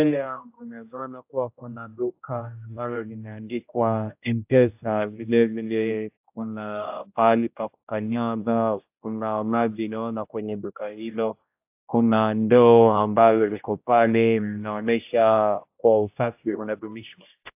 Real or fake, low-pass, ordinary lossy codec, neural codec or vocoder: fake; 3.6 kHz; AAC, 32 kbps; codec, 16 kHz, 0.5 kbps, X-Codec, HuBERT features, trained on general audio